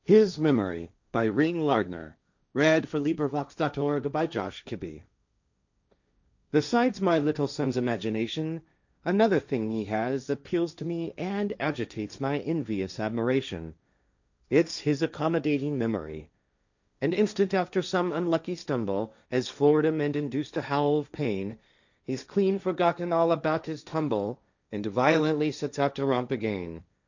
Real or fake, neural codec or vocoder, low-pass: fake; codec, 16 kHz, 1.1 kbps, Voila-Tokenizer; 7.2 kHz